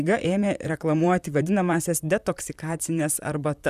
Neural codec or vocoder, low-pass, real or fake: vocoder, 44.1 kHz, 128 mel bands, Pupu-Vocoder; 14.4 kHz; fake